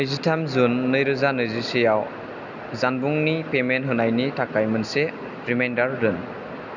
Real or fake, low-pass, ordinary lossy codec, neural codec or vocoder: real; 7.2 kHz; none; none